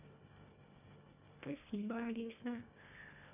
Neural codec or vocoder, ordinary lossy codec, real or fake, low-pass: codec, 24 kHz, 1.5 kbps, HILCodec; none; fake; 3.6 kHz